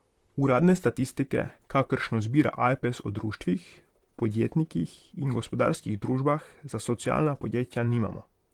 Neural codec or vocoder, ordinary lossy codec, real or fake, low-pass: vocoder, 44.1 kHz, 128 mel bands, Pupu-Vocoder; Opus, 24 kbps; fake; 19.8 kHz